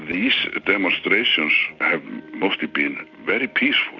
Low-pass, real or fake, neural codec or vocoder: 7.2 kHz; real; none